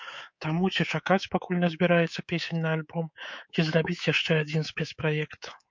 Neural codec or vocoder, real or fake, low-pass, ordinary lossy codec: codec, 24 kHz, 3.1 kbps, DualCodec; fake; 7.2 kHz; MP3, 48 kbps